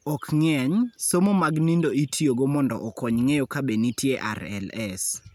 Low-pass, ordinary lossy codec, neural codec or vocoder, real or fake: 19.8 kHz; none; none; real